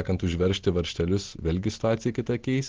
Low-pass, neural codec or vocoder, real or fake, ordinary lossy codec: 7.2 kHz; none; real; Opus, 16 kbps